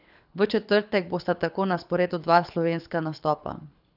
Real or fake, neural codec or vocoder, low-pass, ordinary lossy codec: fake; codec, 24 kHz, 6 kbps, HILCodec; 5.4 kHz; none